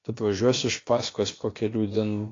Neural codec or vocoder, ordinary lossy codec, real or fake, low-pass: codec, 16 kHz, about 1 kbps, DyCAST, with the encoder's durations; AAC, 32 kbps; fake; 7.2 kHz